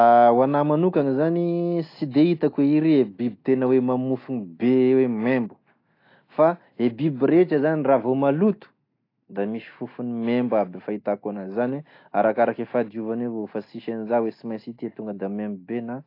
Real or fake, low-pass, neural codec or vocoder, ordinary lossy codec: real; 5.4 kHz; none; AAC, 32 kbps